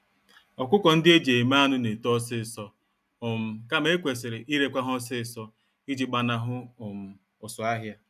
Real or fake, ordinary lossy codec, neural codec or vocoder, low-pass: real; none; none; 14.4 kHz